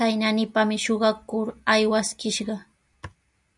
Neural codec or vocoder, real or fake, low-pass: none; real; 10.8 kHz